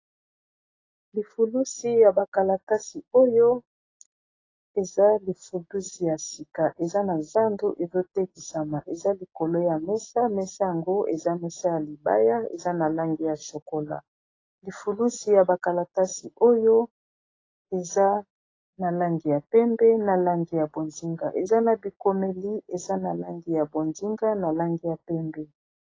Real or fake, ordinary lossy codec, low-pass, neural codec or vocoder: real; AAC, 32 kbps; 7.2 kHz; none